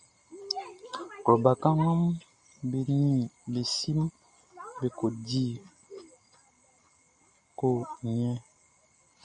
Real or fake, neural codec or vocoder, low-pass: real; none; 9.9 kHz